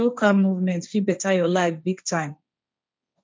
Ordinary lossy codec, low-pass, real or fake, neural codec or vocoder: none; none; fake; codec, 16 kHz, 1.1 kbps, Voila-Tokenizer